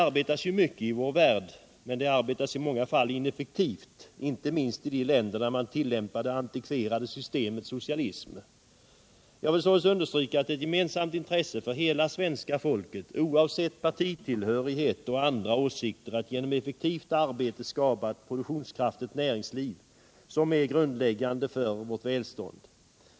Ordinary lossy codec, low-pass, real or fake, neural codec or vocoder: none; none; real; none